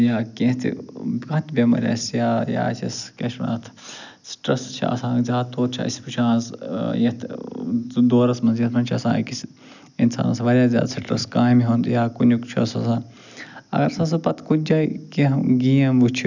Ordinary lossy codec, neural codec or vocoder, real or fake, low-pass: none; none; real; 7.2 kHz